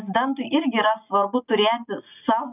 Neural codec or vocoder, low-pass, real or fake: none; 3.6 kHz; real